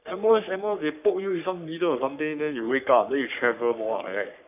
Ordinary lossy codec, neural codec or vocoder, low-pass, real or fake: none; codec, 44.1 kHz, 3.4 kbps, Pupu-Codec; 3.6 kHz; fake